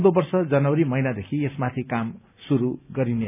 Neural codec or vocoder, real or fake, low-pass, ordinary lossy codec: none; real; 3.6 kHz; none